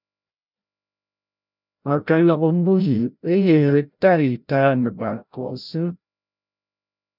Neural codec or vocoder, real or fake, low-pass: codec, 16 kHz, 0.5 kbps, FreqCodec, larger model; fake; 5.4 kHz